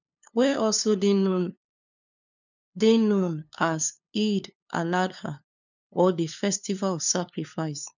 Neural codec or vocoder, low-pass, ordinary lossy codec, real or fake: codec, 16 kHz, 2 kbps, FunCodec, trained on LibriTTS, 25 frames a second; 7.2 kHz; none; fake